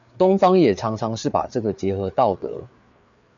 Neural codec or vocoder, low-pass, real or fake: codec, 16 kHz, 4 kbps, FreqCodec, larger model; 7.2 kHz; fake